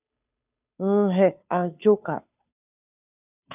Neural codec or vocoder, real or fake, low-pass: codec, 16 kHz, 2 kbps, FunCodec, trained on Chinese and English, 25 frames a second; fake; 3.6 kHz